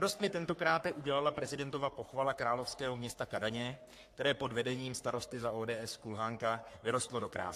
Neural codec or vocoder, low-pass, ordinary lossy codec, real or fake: codec, 44.1 kHz, 3.4 kbps, Pupu-Codec; 14.4 kHz; AAC, 64 kbps; fake